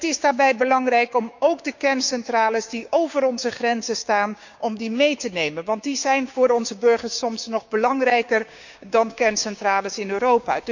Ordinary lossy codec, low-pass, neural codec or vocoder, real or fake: none; 7.2 kHz; codec, 16 kHz, 6 kbps, DAC; fake